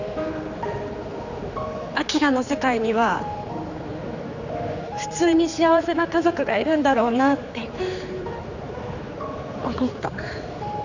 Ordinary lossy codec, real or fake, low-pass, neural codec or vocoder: none; fake; 7.2 kHz; codec, 16 kHz, 4 kbps, X-Codec, HuBERT features, trained on general audio